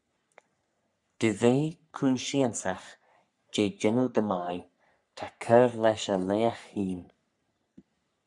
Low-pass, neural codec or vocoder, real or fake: 10.8 kHz; codec, 44.1 kHz, 3.4 kbps, Pupu-Codec; fake